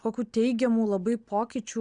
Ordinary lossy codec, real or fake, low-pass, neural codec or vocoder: Opus, 64 kbps; real; 9.9 kHz; none